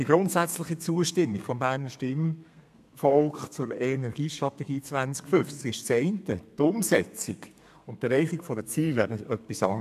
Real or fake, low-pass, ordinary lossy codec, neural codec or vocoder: fake; 14.4 kHz; AAC, 96 kbps; codec, 32 kHz, 1.9 kbps, SNAC